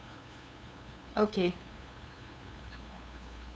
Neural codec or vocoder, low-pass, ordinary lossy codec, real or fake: codec, 16 kHz, 2 kbps, FunCodec, trained on LibriTTS, 25 frames a second; none; none; fake